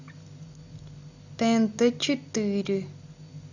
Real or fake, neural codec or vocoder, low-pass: real; none; 7.2 kHz